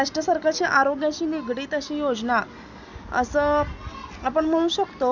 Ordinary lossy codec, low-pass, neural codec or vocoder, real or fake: none; 7.2 kHz; none; real